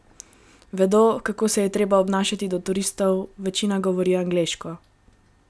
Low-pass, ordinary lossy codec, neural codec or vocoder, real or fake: none; none; none; real